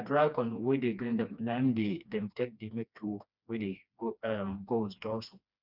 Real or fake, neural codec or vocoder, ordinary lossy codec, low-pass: fake; codec, 16 kHz, 2 kbps, FreqCodec, smaller model; none; 5.4 kHz